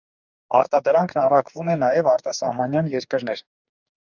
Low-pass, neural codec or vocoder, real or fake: 7.2 kHz; codec, 44.1 kHz, 2.6 kbps, DAC; fake